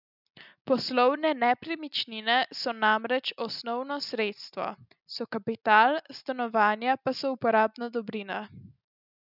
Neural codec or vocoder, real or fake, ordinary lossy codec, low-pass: none; real; none; 5.4 kHz